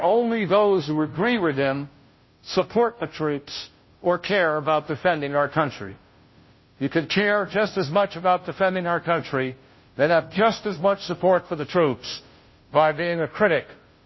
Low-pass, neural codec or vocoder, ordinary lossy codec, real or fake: 7.2 kHz; codec, 16 kHz, 0.5 kbps, FunCodec, trained on Chinese and English, 25 frames a second; MP3, 24 kbps; fake